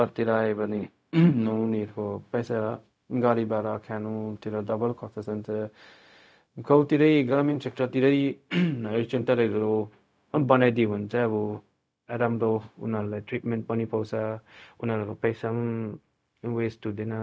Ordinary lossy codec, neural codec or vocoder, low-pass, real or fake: none; codec, 16 kHz, 0.4 kbps, LongCat-Audio-Codec; none; fake